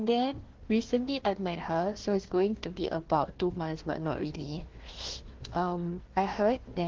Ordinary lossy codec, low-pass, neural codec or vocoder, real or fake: Opus, 16 kbps; 7.2 kHz; codec, 16 kHz, 1 kbps, FunCodec, trained on Chinese and English, 50 frames a second; fake